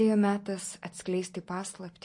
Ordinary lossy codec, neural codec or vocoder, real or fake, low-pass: MP3, 48 kbps; none; real; 10.8 kHz